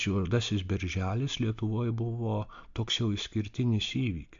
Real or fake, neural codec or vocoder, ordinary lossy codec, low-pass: real; none; AAC, 64 kbps; 7.2 kHz